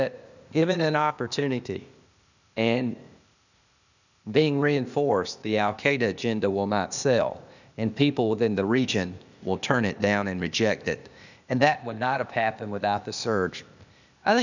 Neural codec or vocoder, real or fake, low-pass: codec, 16 kHz, 0.8 kbps, ZipCodec; fake; 7.2 kHz